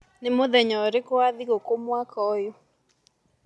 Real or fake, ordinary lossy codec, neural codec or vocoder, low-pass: real; none; none; none